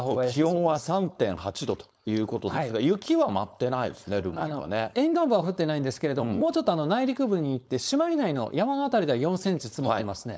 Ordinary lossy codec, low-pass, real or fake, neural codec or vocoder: none; none; fake; codec, 16 kHz, 4.8 kbps, FACodec